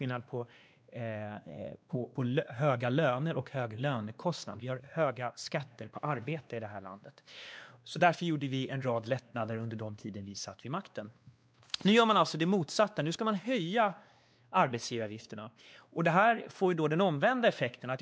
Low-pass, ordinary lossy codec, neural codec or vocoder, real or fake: none; none; codec, 16 kHz, 2 kbps, X-Codec, WavLM features, trained on Multilingual LibriSpeech; fake